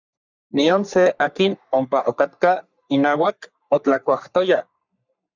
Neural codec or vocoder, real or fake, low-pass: codec, 44.1 kHz, 3.4 kbps, Pupu-Codec; fake; 7.2 kHz